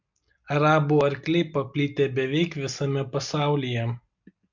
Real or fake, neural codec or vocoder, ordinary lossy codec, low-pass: real; none; MP3, 64 kbps; 7.2 kHz